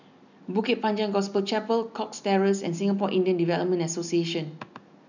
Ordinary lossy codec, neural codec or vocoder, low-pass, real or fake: none; none; 7.2 kHz; real